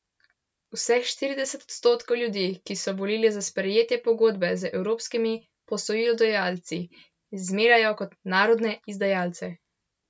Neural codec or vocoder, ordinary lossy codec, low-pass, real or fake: none; none; none; real